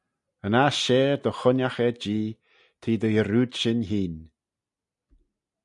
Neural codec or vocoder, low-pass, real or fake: none; 10.8 kHz; real